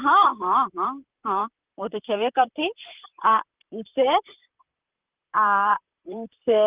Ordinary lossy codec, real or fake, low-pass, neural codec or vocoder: Opus, 32 kbps; fake; 3.6 kHz; vocoder, 44.1 kHz, 128 mel bands, Pupu-Vocoder